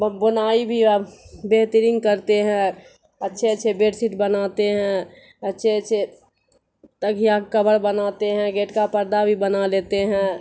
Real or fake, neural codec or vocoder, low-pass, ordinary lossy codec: real; none; none; none